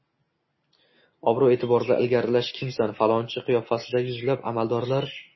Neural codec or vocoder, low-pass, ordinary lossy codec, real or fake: none; 7.2 kHz; MP3, 24 kbps; real